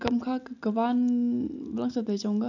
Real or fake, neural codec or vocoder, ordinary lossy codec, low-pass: real; none; none; 7.2 kHz